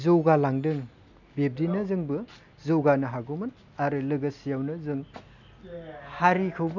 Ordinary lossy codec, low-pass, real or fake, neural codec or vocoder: none; 7.2 kHz; real; none